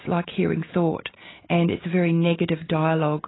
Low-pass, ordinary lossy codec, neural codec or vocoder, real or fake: 7.2 kHz; AAC, 16 kbps; none; real